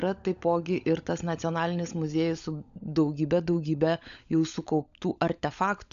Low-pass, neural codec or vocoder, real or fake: 7.2 kHz; codec, 16 kHz, 16 kbps, FunCodec, trained on LibriTTS, 50 frames a second; fake